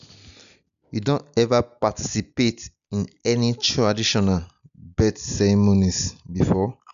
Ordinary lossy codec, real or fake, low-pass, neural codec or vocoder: none; real; 7.2 kHz; none